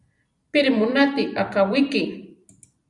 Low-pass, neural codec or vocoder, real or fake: 10.8 kHz; vocoder, 44.1 kHz, 128 mel bands every 256 samples, BigVGAN v2; fake